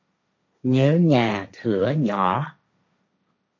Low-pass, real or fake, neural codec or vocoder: 7.2 kHz; fake; codec, 16 kHz, 1.1 kbps, Voila-Tokenizer